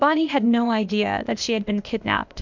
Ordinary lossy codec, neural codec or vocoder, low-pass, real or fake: MP3, 64 kbps; codec, 16 kHz, 0.8 kbps, ZipCodec; 7.2 kHz; fake